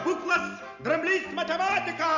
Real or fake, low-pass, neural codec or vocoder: real; 7.2 kHz; none